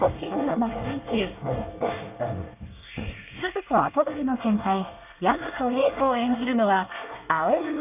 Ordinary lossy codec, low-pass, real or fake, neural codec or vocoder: none; 3.6 kHz; fake; codec, 24 kHz, 1 kbps, SNAC